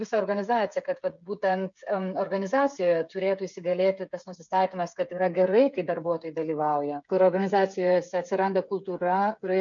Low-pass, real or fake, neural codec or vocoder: 7.2 kHz; fake; codec, 16 kHz, 8 kbps, FreqCodec, smaller model